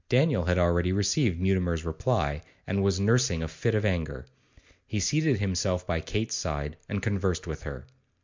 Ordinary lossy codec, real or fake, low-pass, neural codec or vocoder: MP3, 64 kbps; real; 7.2 kHz; none